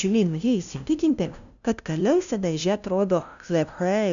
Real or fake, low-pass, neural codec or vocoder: fake; 7.2 kHz; codec, 16 kHz, 0.5 kbps, FunCodec, trained on LibriTTS, 25 frames a second